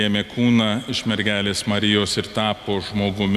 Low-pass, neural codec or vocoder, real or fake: 14.4 kHz; none; real